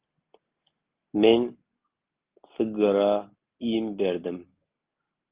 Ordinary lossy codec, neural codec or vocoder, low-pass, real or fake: Opus, 32 kbps; none; 3.6 kHz; real